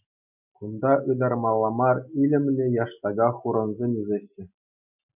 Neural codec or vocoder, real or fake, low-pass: none; real; 3.6 kHz